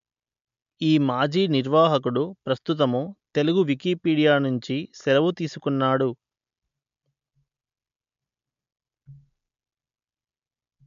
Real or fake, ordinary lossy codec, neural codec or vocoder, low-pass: real; MP3, 64 kbps; none; 7.2 kHz